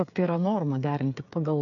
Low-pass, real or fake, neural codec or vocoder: 7.2 kHz; fake; codec, 16 kHz, 8 kbps, FreqCodec, smaller model